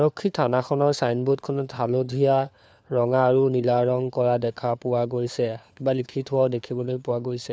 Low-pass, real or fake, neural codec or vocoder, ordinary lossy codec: none; fake; codec, 16 kHz, 4 kbps, FunCodec, trained on LibriTTS, 50 frames a second; none